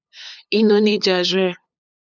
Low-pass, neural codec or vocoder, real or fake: 7.2 kHz; codec, 16 kHz, 8 kbps, FunCodec, trained on LibriTTS, 25 frames a second; fake